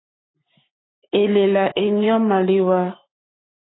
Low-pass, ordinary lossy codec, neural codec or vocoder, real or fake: 7.2 kHz; AAC, 16 kbps; codec, 44.1 kHz, 7.8 kbps, Pupu-Codec; fake